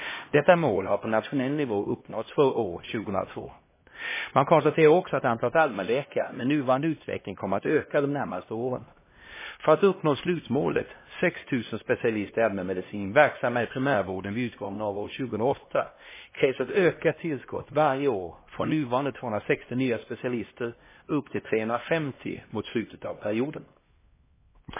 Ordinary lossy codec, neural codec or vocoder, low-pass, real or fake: MP3, 16 kbps; codec, 16 kHz, 1 kbps, X-Codec, HuBERT features, trained on LibriSpeech; 3.6 kHz; fake